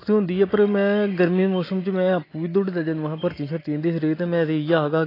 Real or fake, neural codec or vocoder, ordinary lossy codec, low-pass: real; none; AAC, 24 kbps; 5.4 kHz